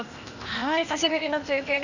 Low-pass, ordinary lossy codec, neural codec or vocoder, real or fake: 7.2 kHz; none; codec, 16 kHz, 0.8 kbps, ZipCodec; fake